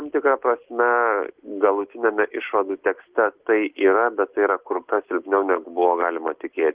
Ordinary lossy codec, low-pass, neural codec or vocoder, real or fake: Opus, 16 kbps; 3.6 kHz; none; real